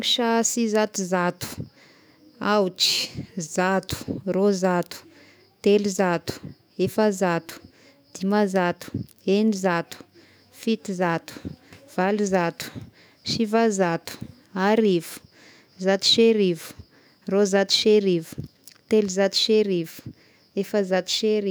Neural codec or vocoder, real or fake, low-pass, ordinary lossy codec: autoencoder, 48 kHz, 128 numbers a frame, DAC-VAE, trained on Japanese speech; fake; none; none